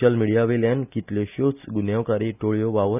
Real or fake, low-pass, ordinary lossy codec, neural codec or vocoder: real; 3.6 kHz; none; none